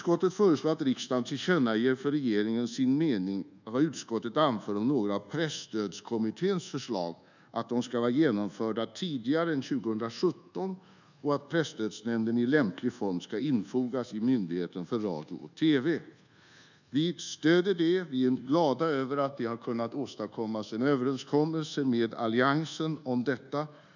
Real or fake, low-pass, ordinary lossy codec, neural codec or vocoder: fake; 7.2 kHz; none; codec, 24 kHz, 1.2 kbps, DualCodec